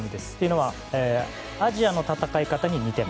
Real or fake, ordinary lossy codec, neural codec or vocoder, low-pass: real; none; none; none